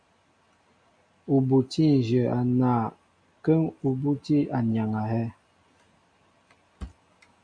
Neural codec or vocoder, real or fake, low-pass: none; real; 9.9 kHz